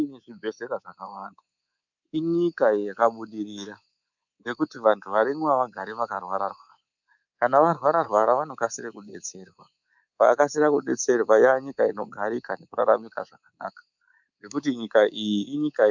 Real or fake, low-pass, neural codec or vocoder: fake; 7.2 kHz; codec, 24 kHz, 3.1 kbps, DualCodec